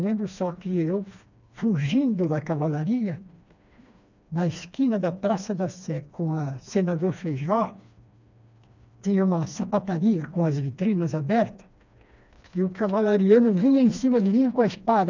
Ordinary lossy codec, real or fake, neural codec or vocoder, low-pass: none; fake; codec, 16 kHz, 2 kbps, FreqCodec, smaller model; 7.2 kHz